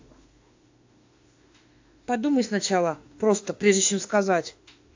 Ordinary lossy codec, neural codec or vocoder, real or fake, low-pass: AAC, 48 kbps; autoencoder, 48 kHz, 32 numbers a frame, DAC-VAE, trained on Japanese speech; fake; 7.2 kHz